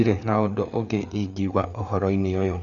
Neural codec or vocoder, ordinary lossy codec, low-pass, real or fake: codec, 16 kHz, 4 kbps, FreqCodec, larger model; none; 7.2 kHz; fake